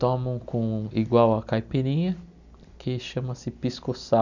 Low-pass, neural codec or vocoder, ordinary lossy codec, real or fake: 7.2 kHz; none; none; real